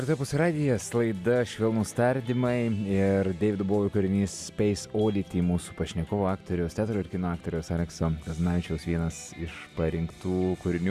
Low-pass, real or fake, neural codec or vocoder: 14.4 kHz; real; none